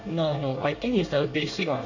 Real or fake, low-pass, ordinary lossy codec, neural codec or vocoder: fake; 7.2 kHz; none; codec, 24 kHz, 1 kbps, SNAC